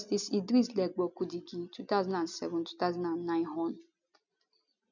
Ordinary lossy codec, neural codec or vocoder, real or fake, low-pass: none; none; real; 7.2 kHz